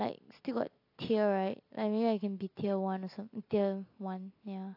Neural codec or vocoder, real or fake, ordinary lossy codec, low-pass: none; real; AAC, 32 kbps; 5.4 kHz